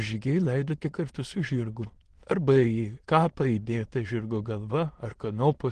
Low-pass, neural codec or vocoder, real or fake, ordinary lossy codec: 9.9 kHz; autoencoder, 22.05 kHz, a latent of 192 numbers a frame, VITS, trained on many speakers; fake; Opus, 16 kbps